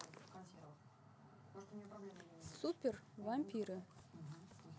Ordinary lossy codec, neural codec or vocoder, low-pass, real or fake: none; none; none; real